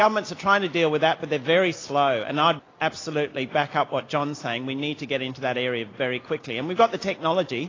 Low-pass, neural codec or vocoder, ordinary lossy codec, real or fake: 7.2 kHz; none; AAC, 32 kbps; real